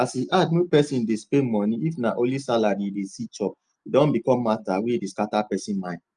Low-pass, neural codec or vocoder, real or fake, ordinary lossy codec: 9.9 kHz; none; real; Opus, 32 kbps